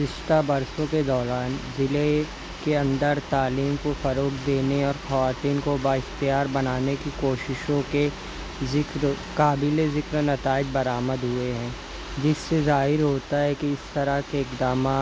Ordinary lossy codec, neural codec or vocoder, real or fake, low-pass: none; none; real; none